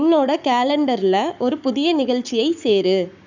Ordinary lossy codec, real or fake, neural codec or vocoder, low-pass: none; fake; codec, 44.1 kHz, 7.8 kbps, Pupu-Codec; 7.2 kHz